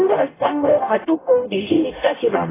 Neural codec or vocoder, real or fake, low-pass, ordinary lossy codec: codec, 44.1 kHz, 0.9 kbps, DAC; fake; 3.6 kHz; AAC, 16 kbps